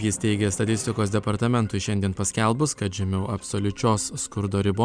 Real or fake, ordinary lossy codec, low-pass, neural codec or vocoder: fake; Opus, 64 kbps; 9.9 kHz; vocoder, 44.1 kHz, 128 mel bands every 256 samples, BigVGAN v2